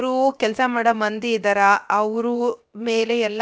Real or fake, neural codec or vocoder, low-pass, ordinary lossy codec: fake; codec, 16 kHz, 0.7 kbps, FocalCodec; none; none